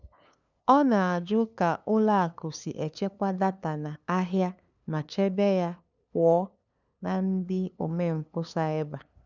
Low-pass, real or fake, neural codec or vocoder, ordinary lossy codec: 7.2 kHz; fake; codec, 16 kHz, 2 kbps, FunCodec, trained on LibriTTS, 25 frames a second; none